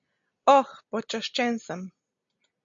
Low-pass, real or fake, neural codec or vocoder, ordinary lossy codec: 7.2 kHz; real; none; MP3, 48 kbps